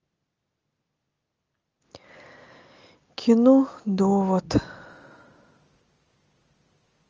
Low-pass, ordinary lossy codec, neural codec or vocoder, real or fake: 7.2 kHz; Opus, 24 kbps; none; real